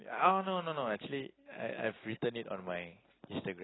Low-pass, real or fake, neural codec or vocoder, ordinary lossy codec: 7.2 kHz; real; none; AAC, 16 kbps